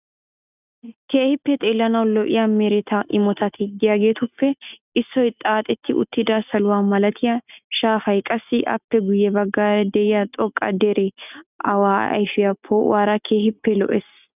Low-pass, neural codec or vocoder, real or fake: 3.6 kHz; none; real